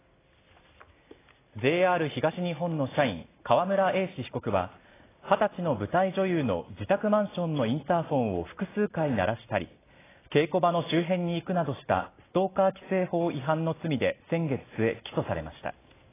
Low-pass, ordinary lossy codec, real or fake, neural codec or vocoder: 3.6 kHz; AAC, 16 kbps; real; none